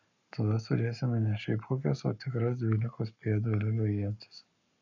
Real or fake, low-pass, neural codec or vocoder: fake; 7.2 kHz; codec, 44.1 kHz, 7.8 kbps, Pupu-Codec